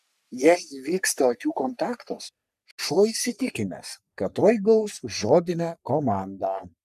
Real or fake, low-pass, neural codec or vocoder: fake; 14.4 kHz; codec, 44.1 kHz, 3.4 kbps, Pupu-Codec